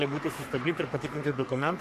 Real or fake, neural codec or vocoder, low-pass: fake; codec, 44.1 kHz, 3.4 kbps, Pupu-Codec; 14.4 kHz